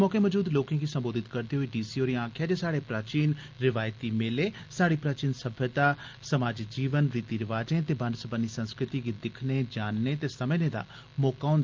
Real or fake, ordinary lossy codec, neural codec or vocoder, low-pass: real; Opus, 24 kbps; none; 7.2 kHz